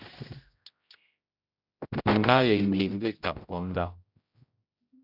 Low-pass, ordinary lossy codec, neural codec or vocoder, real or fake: 5.4 kHz; Opus, 64 kbps; codec, 16 kHz, 0.5 kbps, X-Codec, HuBERT features, trained on general audio; fake